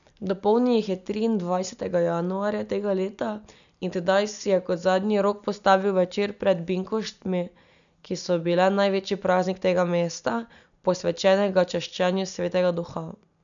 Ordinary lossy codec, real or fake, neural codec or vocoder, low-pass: none; real; none; 7.2 kHz